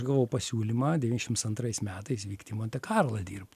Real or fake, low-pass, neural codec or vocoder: real; 14.4 kHz; none